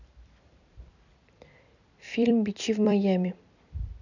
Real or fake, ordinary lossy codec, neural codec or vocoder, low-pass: fake; none; vocoder, 44.1 kHz, 128 mel bands every 256 samples, BigVGAN v2; 7.2 kHz